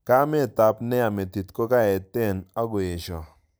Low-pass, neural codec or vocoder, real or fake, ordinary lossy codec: none; none; real; none